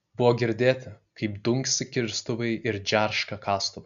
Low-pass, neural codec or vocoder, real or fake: 7.2 kHz; none; real